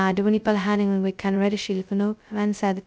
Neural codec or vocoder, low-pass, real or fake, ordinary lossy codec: codec, 16 kHz, 0.2 kbps, FocalCodec; none; fake; none